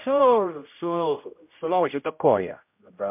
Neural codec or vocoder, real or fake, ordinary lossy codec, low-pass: codec, 16 kHz, 0.5 kbps, X-Codec, HuBERT features, trained on general audio; fake; MP3, 32 kbps; 3.6 kHz